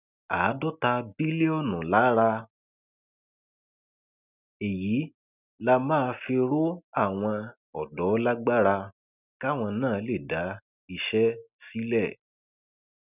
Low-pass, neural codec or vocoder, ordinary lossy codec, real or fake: 3.6 kHz; none; none; real